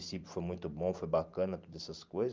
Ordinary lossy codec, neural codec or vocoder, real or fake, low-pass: Opus, 24 kbps; vocoder, 44.1 kHz, 128 mel bands every 512 samples, BigVGAN v2; fake; 7.2 kHz